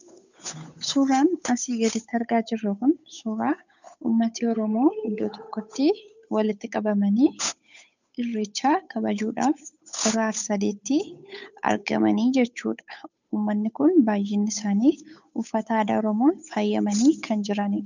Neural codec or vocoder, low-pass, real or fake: codec, 16 kHz, 8 kbps, FunCodec, trained on Chinese and English, 25 frames a second; 7.2 kHz; fake